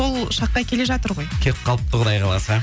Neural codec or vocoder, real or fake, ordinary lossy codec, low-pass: none; real; none; none